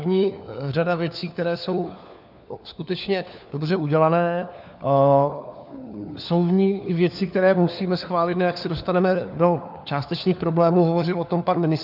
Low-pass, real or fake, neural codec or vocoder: 5.4 kHz; fake; codec, 16 kHz, 4 kbps, FunCodec, trained on LibriTTS, 50 frames a second